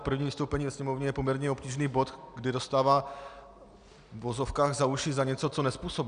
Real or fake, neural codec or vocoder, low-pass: real; none; 9.9 kHz